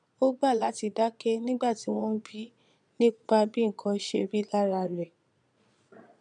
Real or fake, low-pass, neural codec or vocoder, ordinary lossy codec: fake; 9.9 kHz; vocoder, 22.05 kHz, 80 mel bands, Vocos; none